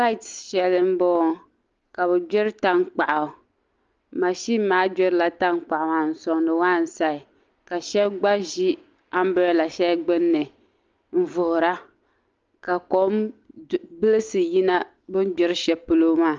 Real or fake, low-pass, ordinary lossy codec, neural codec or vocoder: real; 7.2 kHz; Opus, 24 kbps; none